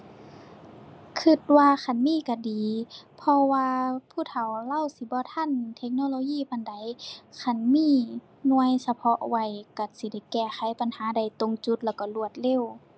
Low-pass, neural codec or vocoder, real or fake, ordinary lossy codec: none; none; real; none